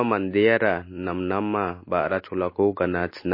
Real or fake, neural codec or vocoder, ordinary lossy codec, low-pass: real; none; MP3, 24 kbps; 5.4 kHz